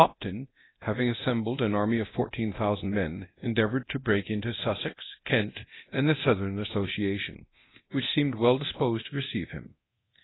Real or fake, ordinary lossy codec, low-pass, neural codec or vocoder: fake; AAC, 16 kbps; 7.2 kHz; codec, 24 kHz, 0.9 kbps, DualCodec